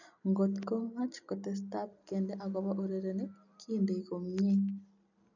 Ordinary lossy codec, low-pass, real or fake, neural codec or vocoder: none; 7.2 kHz; real; none